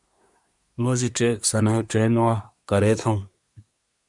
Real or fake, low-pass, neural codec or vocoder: fake; 10.8 kHz; codec, 24 kHz, 1 kbps, SNAC